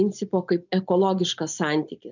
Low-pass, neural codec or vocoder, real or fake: 7.2 kHz; none; real